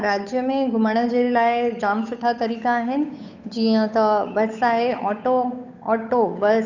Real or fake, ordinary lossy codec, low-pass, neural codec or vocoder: fake; none; 7.2 kHz; codec, 16 kHz, 8 kbps, FunCodec, trained on Chinese and English, 25 frames a second